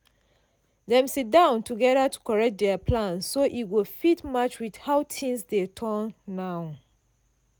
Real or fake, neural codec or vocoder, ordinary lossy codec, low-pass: real; none; none; none